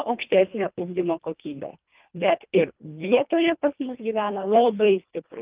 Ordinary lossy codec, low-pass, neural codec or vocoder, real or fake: Opus, 24 kbps; 3.6 kHz; codec, 24 kHz, 1.5 kbps, HILCodec; fake